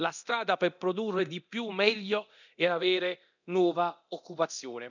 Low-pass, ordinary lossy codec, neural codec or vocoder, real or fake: 7.2 kHz; none; codec, 24 kHz, 0.9 kbps, DualCodec; fake